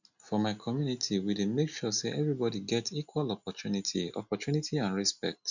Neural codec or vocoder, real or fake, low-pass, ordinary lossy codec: none; real; 7.2 kHz; none